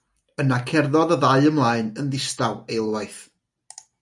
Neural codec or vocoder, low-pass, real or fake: none; 10.8 kHz; real